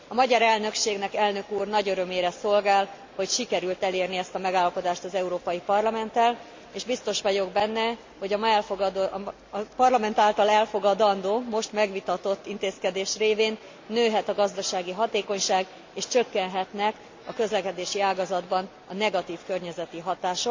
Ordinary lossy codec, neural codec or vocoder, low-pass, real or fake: MP3, 64 kbps; none; 7.2 kHz; real